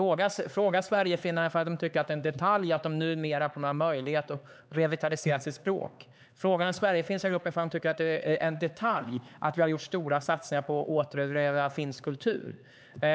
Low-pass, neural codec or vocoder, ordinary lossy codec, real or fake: none; codec, 16 kHz, 4 kbps, X-Codec, HuBERT features, trained on LibriSpeech; none; fake